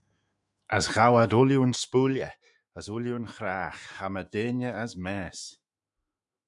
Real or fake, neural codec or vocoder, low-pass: fake; autoencoder, 48 kHz, 128 numbers a frame, DAC-VAE, trained on Japanese speech; 10.8 kHz